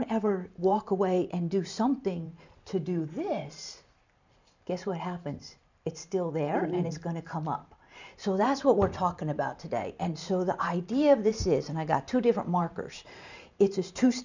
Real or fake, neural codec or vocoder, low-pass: fake; vocoder, 44.1 kHz, 128 mel bands every 512 samples, BigVGAN v2; 7.2 kHz